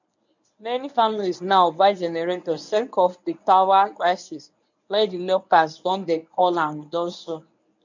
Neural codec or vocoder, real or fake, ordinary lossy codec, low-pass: codec, 24 kHz, 0.9 kbps, WavTokenizer, medium speech release version 1; fake; none; 7.2 kHz